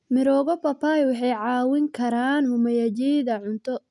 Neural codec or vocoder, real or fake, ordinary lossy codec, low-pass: none; real; none; 10.8 kHz